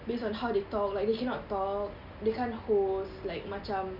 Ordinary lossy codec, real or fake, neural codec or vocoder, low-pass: none; real; none; 5.4 kHz